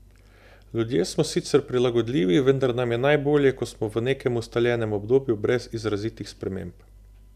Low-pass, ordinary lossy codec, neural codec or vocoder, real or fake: 14.4 kHz; none; none; real